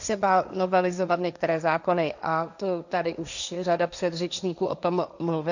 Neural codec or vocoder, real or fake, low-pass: codec, 16 kHz, 1.1 kbps, Voila-Tokenizer; fake; 7.2 kHz